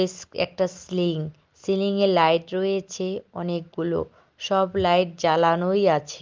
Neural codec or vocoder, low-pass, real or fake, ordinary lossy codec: none; 7.2 kHz; real; Opus, 32 kbps